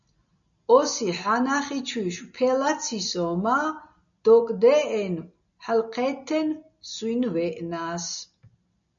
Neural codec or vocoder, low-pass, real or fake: none; 7.2 kHz; real